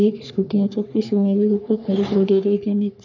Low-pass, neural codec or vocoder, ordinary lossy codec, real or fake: 7.2 kHz; codec, 44.1 kHz, 3.4 kbps, Pupu-Codec; none; fake